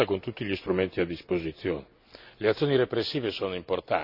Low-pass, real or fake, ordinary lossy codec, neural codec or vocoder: 5.4 kHz; real; none; none